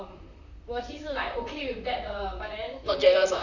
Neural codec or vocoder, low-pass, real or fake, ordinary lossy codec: vocoder, 44.1 kHz, 128 mel bands, Pupu-Vocoder; 7.2 kHz; fake; none